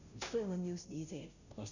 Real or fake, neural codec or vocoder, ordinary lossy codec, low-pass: fake; codec, 16 kHz, 0.5 kbps, FunCodec, trained on Chinese and English, 25 frames a second; none; 7.2 kHz